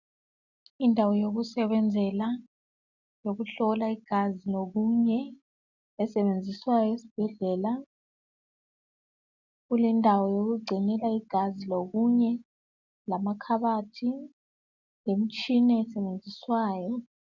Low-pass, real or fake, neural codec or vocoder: 7.2 kHz; real; none